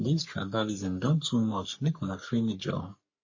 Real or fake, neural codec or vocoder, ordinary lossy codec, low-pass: fake; codec, 44.1 kHz, 3.4 kbps, Pupu-Codec; MP3, 32 kbps; 7.2 kHz